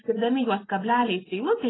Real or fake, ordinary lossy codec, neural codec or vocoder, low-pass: real; AAC, 16 kbps; none; 7.2 kHz